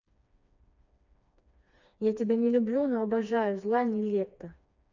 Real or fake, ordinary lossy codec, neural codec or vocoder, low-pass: fake; none; codec, 16 kHz, 2 kbps, FreqCodec, smaller model; 7.2 kHz